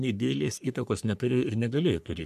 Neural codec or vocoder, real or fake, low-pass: codec, 44.1 kHz, 3.4 kbps, Pupu-Codec; fake; 14.4 kHz